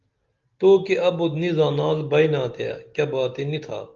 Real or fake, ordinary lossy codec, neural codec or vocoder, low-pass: real; Opus, 32 kbps; none; 7.2 kHz